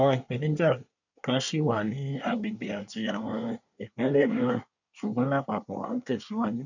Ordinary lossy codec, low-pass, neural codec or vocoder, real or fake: none; 7.2 kHz; codec, 24 kHz, 1 kbps, SNAC; fake